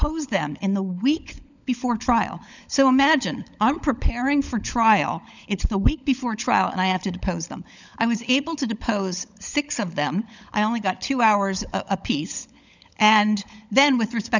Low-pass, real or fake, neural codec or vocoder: 7.2 kHz; fake; codec, 16 kHz, 16 kbps, FunCodec, trained on LibriTTS, 50 frames a second